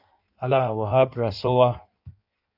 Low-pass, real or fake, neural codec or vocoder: 5.4 kHz; fake; codec, 16 kHz in and 24 kHz out, 1.1 kbps, FireRedTTS-2 codec